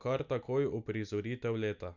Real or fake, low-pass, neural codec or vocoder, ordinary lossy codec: real; 7.2 kHz; none; none